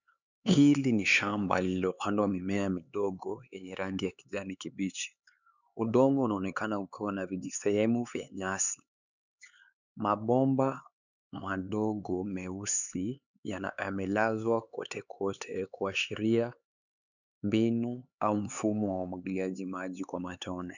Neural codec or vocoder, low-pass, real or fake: codec, 16 kHz, 4 kbps, X-Codec, HuBERT features, trained on LibriSpeech; 7.2 kHz; fake